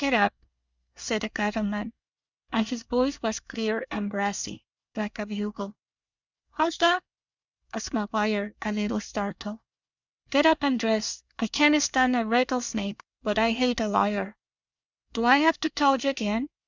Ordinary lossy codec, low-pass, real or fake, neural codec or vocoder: Opus, 64 kbps; 7.2 kHz; fake; codec, 24 kHz, 1 kbps, SNAC